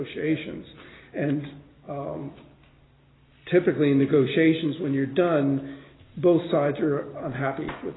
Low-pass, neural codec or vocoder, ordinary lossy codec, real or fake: 7.2 kHz; none; AAC, 16 kbps; real